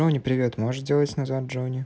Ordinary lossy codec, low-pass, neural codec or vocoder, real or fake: none; none; none; real